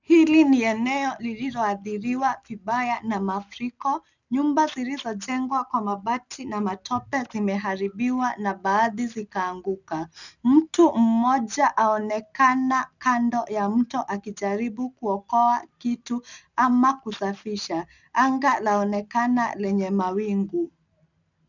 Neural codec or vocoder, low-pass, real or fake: none; 7.2 kHz; real